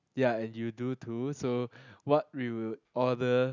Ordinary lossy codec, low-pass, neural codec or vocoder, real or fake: none; 7.2 kHz; none; real